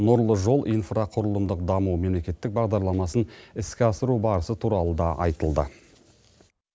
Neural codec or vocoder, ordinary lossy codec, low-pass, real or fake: none; none; none; real